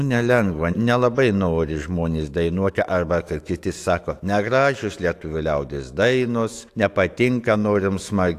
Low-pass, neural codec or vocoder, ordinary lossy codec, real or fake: 14.4 kHz; autoencoder, 48 kHz, 128 numbers a frame, DAC-VAE, trained on Japanese speech; AAC, 64 kbps; fake